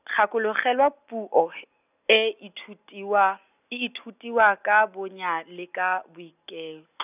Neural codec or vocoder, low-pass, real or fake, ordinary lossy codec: none; 3.6 kHz; real; none